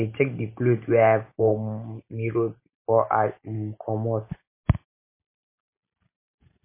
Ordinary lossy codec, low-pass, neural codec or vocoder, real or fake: MP3, 24 kbps; 3.6 kHz; none; real